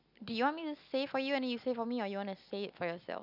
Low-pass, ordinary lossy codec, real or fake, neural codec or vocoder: 5.4 kHz; none; real; none